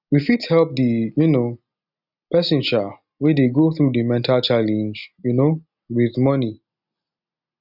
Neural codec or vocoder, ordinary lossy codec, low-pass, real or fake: none; none; 5.4 kHz; real